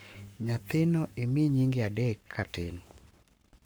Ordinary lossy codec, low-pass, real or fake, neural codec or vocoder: none; none; fake; codec, 44.1 kHz, 7.8 kbps, Pupu-Codec